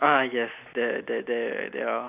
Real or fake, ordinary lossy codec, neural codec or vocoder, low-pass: real; none; none; 3.6 kHz